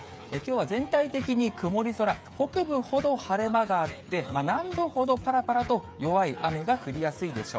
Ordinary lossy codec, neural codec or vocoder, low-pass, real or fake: none; codec, 16 kHz, 8 kbps, FreqCodec, smaller model; none; fake